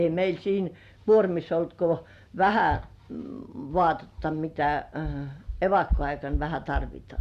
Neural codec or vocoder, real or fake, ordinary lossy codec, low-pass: none; real; Opus, 64 kbps; 14.4 kHz